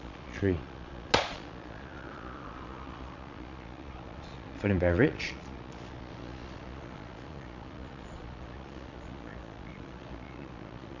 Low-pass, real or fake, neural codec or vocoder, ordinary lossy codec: 7.2 kHz; fake; vocoder, 22.05 kHz, 80 mel bands, Vocos; none